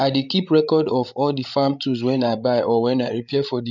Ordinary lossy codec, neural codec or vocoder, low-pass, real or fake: none; codec, 16 kHz, 16 kbps, FreqCodec, larger model; 7.2 kHz; fake